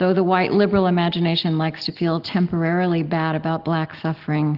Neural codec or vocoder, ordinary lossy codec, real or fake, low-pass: none; Opus, 16 kbps; real; 5.4 kHz